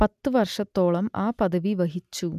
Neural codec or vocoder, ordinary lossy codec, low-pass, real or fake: none; none; 14.4 kHz; real